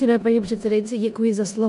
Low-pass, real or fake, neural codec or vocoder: 10.8 kHz; fake; codec, 16 kHz in and 24 kHz out, 0.9 kbps, LongCat-Audio-Codec, four codebook decoder